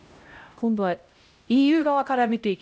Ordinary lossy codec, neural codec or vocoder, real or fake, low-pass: none; codec, 16 kHz, 0.5 kbps, X-Codec, HuBERT features, trained on LibriSpeech; fake; none